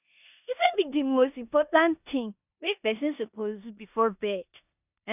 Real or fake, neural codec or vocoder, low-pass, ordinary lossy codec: fake; codec, 16 kHz in and 24 kHz out, 0.9 kbps, LongCat-Audio-Codec, four codebook decoder; 3.6 kHz; none